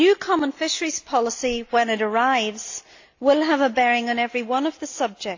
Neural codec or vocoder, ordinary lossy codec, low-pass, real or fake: none; AAC, 48 kbps; 7.2 kHz; real